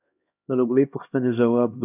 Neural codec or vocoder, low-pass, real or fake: codec, 16 kHz, 1 kbps, X-Codec, HuBERT features, trained on LibriSpeech; 3.6 kHz; fake